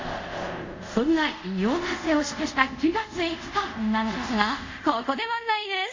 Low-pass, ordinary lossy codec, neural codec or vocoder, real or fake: 7.2 kHz; MP3, 64 kbps; codec, 24 kHz, 0.5 kbps, DualCodec; fake